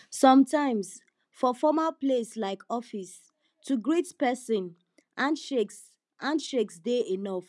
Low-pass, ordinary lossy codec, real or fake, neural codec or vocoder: none; none; real; none